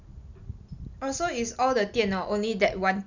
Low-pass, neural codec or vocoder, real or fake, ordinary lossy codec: 7.2 kHz; none; real; none